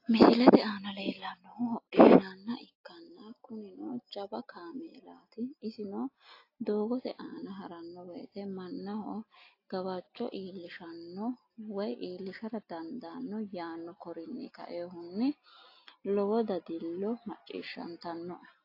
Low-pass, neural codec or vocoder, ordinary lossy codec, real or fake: 5.4 kHz; none; AAC, 32 kbps; real